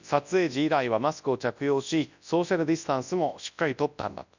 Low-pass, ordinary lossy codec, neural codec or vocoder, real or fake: 7.2 kHz; none; codec, 24 kHz, 0.9 kbps, WavTokenizer, large speech release; fake